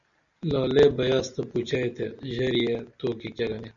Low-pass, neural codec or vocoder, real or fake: 7.2 kHz; none; real